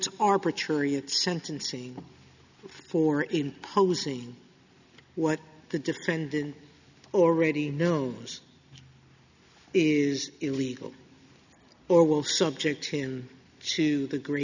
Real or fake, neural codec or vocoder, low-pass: real; none; 7.2 kHz